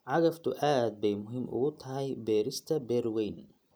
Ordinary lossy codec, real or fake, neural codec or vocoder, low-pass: none; real; none; none